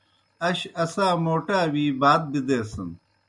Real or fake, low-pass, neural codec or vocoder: real; 10.8 kHz; none